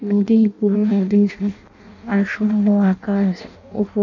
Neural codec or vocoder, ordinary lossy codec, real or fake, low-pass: codec, 16 kHz in and 24 kHz out, 0.6 kbps, FireRedTTS-2 codec; none; fake; 7.2 kHz